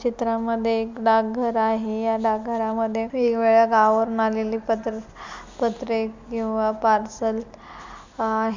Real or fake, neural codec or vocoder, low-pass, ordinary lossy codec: real; none; 7.2 kHz; MP3, 64 kbps